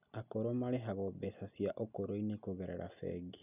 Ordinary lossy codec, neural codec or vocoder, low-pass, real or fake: none; none; 3.6 kHz; real